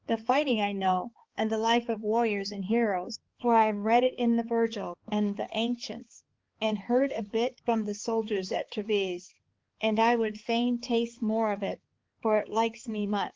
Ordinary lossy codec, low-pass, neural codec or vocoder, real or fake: Opus, 16 kbps; 7.2 kHz; codec, 16 kHz, 4 kbps, X-Codec, HuBERT features, trained on balanced general audio; fake